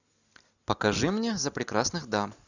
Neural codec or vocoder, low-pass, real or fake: none; 7.2 kHz; real